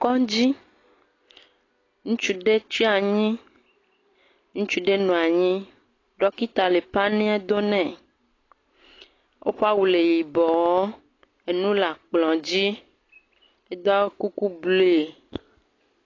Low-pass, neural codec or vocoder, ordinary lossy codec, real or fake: 7.2 kHz; none; AAC, 32 kbps; real